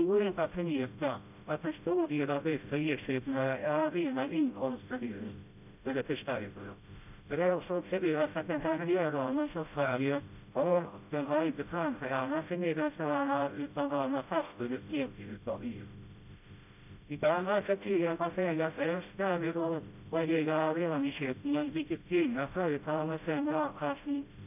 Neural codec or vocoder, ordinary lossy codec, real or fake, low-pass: codec, 16 kHz, 0.5 kbps, FreqCodec, smaller model; none; fake; 3.6 kHz